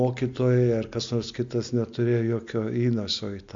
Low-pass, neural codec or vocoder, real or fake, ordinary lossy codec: 7.2 kHz; none; real; MP3, 48 kbps